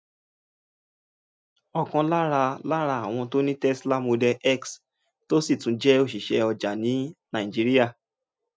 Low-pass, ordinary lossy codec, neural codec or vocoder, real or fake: none; none; none; real